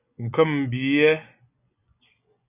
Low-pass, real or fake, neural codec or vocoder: 3.6 kHz; fake; vocoder, 24 kHz, 100 mel bands, Vocos